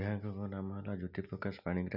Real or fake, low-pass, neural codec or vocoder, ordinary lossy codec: real; 5.4 kHz; none; none